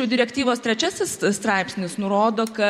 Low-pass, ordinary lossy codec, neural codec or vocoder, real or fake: 19.8 kHz; MP3, 64 kbps; vocoder, 44.1 kHz, 128 mel bands every 512 samples, BigVGAN v2; fake